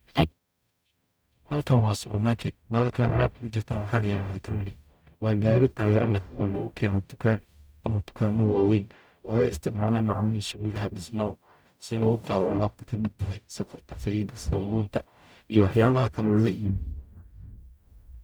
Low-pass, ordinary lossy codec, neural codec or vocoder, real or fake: none; none; codec, 44.1 kHz, 0.9 kbps, DAC; fake